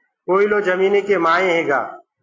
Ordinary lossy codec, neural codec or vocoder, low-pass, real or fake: AAC, 32 kbps; none; 7.2 kHz; real